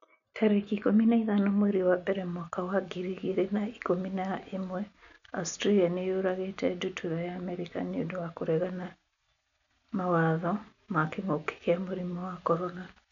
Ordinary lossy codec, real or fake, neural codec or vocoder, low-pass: none; real; none; 7.2 kHz